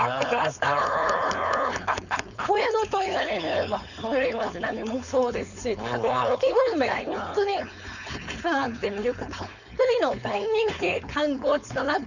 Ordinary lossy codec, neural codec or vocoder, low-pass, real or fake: none; codec, 16 kHz, 4.8 kbps, FACodec; 7.2 kHz; fake